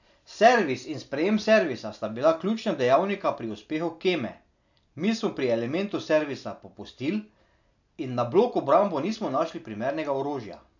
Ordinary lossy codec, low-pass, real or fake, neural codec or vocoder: none; 7.2 kHz; real; none